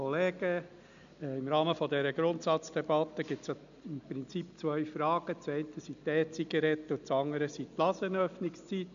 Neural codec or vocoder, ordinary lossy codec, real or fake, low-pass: none; none; real; 7.2 kHz